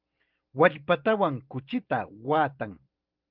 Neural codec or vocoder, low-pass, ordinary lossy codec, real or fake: vocoder, 44.1 kHz, 128 mel bands every 512 samples, BigVGAN v2; 5.4 kHz; Opus, 32 kbps; fake